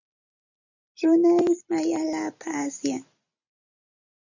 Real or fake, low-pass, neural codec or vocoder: real; 7.2 kHz; none